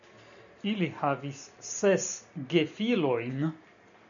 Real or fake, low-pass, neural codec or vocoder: real; 7.2 kHz; none